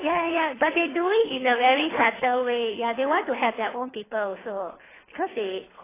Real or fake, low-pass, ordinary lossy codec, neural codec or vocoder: fake; 3.6 kHz; AAC, 16 kbps; codec, 24 kHz, 3 kbps, HILCodec